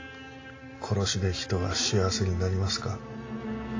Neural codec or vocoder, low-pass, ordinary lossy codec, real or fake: none; 7.2 kHz; AAC, 32 kbps; real